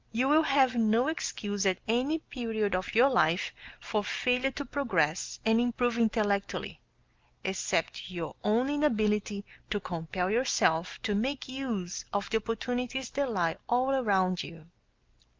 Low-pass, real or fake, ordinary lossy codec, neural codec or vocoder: 7.2 kHz; real; Opus, 32 kbps; none